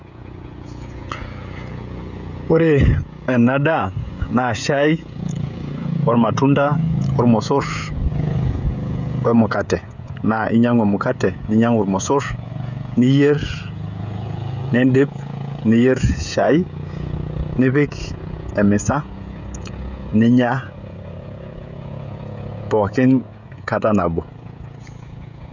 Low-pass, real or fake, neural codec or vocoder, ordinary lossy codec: 7.2 kHz; fake; codec, 16 kHz, 16 kbps, FreqCodec, smaller model; none